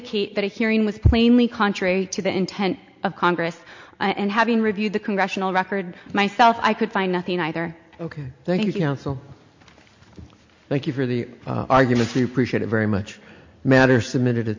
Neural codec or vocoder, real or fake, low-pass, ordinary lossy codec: none; real; 7.2 kHz; MP3, 48 kbps